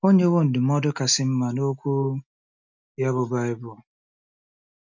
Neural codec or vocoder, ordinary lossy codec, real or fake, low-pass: none; none; real; 7.2 kHz